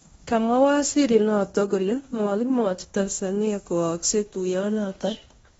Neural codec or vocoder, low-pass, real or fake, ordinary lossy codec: codec, 16 kHz in and 24 kHz out, 0.9 kbps, LongCat-Audio-Codec, fine tuned four codebook decoder; 10.8 kHz; fake; AAC, 24 kbps